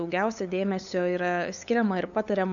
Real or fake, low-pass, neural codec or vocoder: fake; 7.2 kHz; codec, 16 kHz, 8 kbps, FunCodec, trained on LibriTTS, 25 frames a second